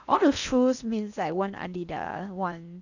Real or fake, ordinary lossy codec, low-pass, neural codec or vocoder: fake; none; 7.2 kHz; codec, 16 kHz in and 24 kHz out, 0.8 kbps, FocalCodec, streaming, 65536 codes